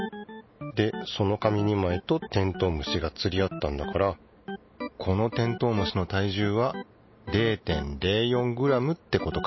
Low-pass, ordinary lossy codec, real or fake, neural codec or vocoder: 7.2 kHz; MP3, 24 kbps; real; none